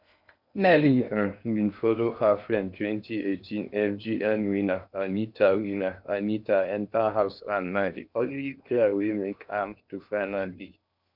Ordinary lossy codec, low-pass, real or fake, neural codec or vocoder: none; 5.4 kHz; fake; codec, 16 kHz in and 24 kHz out, 0.8 kbps, FocalCodec, streaming, 65536 codes